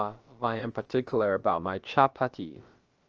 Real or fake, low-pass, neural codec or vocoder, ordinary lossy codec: fake; 7.2 kHz; codec, 16 kHz, about 1 kbps, DyCAST, with the encoder's durations; Opus, 24 kbps